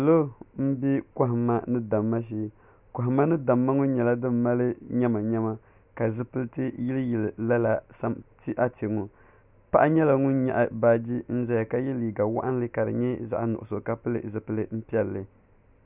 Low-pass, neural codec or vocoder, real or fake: 3.6 kHz; none; real